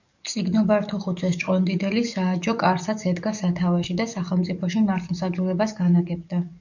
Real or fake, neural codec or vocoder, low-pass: fake; codec, 44.1 kHz, 7.8 kbps, DAC; 7.2 kHz